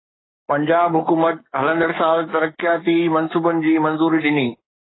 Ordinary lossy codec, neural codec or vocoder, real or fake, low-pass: AAC, 16 kbps; codec, 44.1 kHz, 7.8 kbps, Pupu-Codec; fake; 7.2 kHz